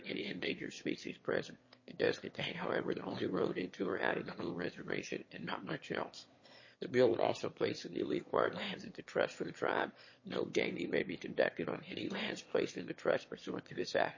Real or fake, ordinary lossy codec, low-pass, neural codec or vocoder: fake; MP3, 32 kbps; 7.2 kHz; autoencoder, 22.05 kHz, a latent of 192 numbers a frame, VITS, trained on one speaker